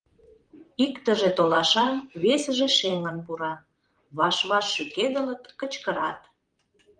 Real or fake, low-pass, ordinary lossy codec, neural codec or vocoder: fake; 9.9 kHz; Opus, 32 kbps; vocoder, 44.1 kHz, 128 mel bands, Pupu-Vocoder